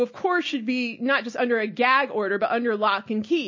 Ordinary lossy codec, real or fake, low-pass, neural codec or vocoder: MP3, 32 kbps; real; 7.2 kHz; none